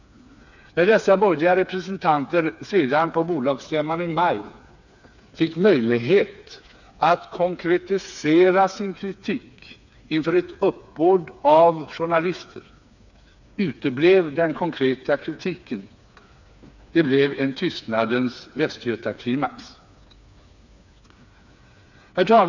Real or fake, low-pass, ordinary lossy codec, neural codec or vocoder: fake; 7.2 kHz; none; codec, 16 kHz, 4 kbps, FreqCodec, smaller model